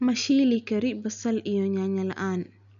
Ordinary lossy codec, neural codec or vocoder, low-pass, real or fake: none; none; 7.2 kHz; real